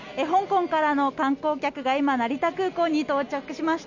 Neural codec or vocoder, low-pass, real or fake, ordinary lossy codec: none; 7.2 kHz; real; none